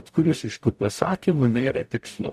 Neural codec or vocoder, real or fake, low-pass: codec, 44.1 kHz, 0.9 kbps, DAC; fake; 14.4 kHz